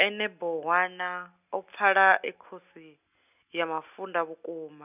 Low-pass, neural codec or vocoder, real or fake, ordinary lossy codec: 3.6 kHz; none; real; none